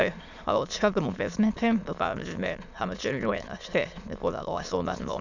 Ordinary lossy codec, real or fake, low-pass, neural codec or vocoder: none; fake; 7.2 kHz; autoencoder, 22.05 kHz, a latent of 192 numbers a frame, VITS, trained on many speakers